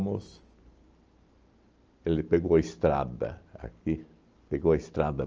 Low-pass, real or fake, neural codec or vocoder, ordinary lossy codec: 7.2 kHz; real; none; Opus, 24 kbps